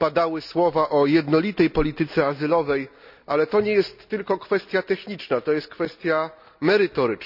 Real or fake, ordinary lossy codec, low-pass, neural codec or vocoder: real; none; 5.4 kHz; none